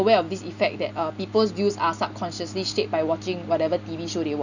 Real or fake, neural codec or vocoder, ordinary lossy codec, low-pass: real; none; none; 7.2 kHz